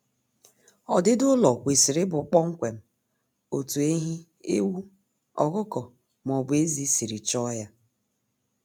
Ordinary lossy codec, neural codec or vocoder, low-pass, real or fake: none; none; 19.8 kHz; real